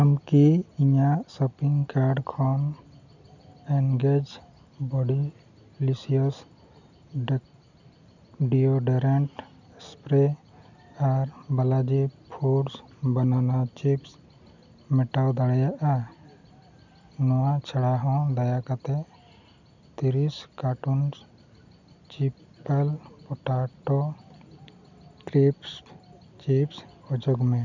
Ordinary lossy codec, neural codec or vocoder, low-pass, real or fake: none; none; 7.2 kHz; real